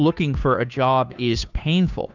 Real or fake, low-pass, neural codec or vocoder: fake; 7.2 kHz; codec, 16 kHz, 2 kbps, FunCodec, trained on Chinese and English, 25 frames a second